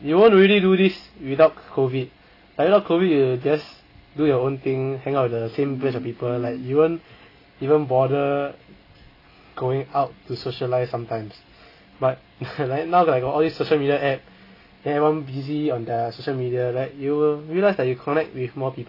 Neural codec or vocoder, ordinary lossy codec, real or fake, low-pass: none; AAC, 32 kbps; real; 5.4 kHz